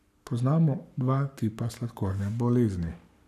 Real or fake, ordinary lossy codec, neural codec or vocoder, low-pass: fake; none; codec, 44.1 kHz, 7.8 kbps, Pupu-Codec; 14.4 kHz